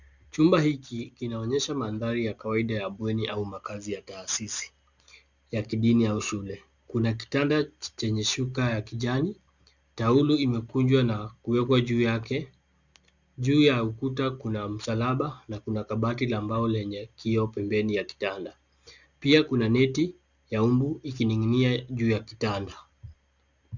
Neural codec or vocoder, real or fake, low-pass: none; real; 7.2 kHz